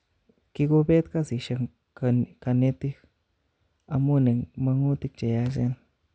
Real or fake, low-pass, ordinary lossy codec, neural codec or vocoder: real; none; none; none